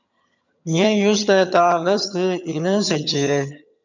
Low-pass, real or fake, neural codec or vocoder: 7.2 kHz; fake; vocoder, 22.05 kHz, 80 mel bands, HiFi-GAN